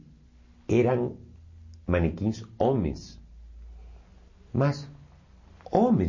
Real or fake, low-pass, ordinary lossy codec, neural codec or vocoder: real; 7.2 kHz; MP3, 32 kbps; none